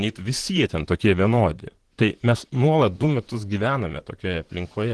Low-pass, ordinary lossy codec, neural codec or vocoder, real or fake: 10.8 kHz; Opus, 16 kbps; vocoder, 44.1 kHz, 128 mel bands, Pupu-Vocoder; fake